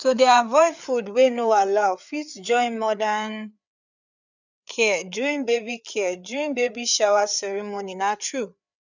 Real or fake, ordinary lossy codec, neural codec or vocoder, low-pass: fake; none; codec, 16 kHz, 4 kbps, FreqCodec, larger model; 7.2 kHz